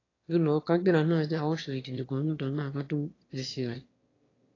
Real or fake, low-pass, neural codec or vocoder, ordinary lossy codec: fake; 7.2 kHz; autoencoder, 22.05 kHz, a latent of 192 numbers a frame, VITS, trained on one speaker; AAC, 32 kbps